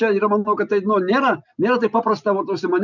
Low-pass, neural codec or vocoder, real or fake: 7.2 kHz; none; real